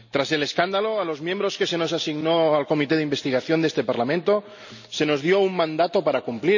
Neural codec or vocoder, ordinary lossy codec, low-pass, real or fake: none; none; 7.2 kHz; real